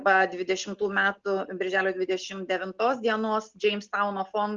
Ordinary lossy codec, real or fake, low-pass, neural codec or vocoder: Opus, 32 kbps; real; 7.2 kHz; none